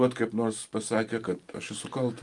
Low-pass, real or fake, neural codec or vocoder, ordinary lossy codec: 10.8 kHz; fake; vocoder, 44.1 kHz, 128 mel bands every 512 samples, BigVGAN v2; Opus, 32 kbps